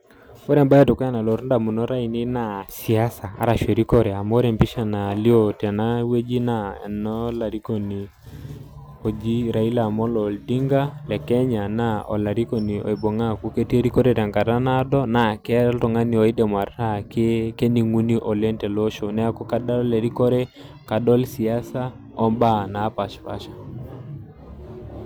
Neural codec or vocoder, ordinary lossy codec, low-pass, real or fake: none; none; none; real